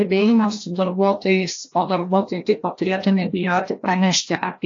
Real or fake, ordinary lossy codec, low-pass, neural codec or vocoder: fake; AAC, 48 kbps; 7.2 kHz; codec, 16 kHz, 1 kbps, FreqCodec, larger model